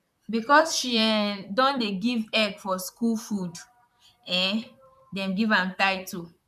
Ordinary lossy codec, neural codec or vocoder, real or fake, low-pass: none; vocoder, 44.1 kHz, 128 mel bands, Pupu-Vocoder; fake; 14.4 kHz